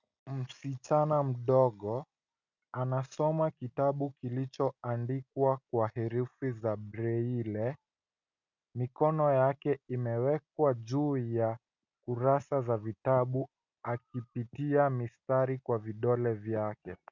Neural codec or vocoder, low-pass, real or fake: none; 7.2 kHz; real